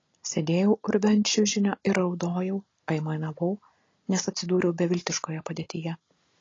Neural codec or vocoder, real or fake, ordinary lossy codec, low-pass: none; real; AAC, 32 kbps; 7.2 kHz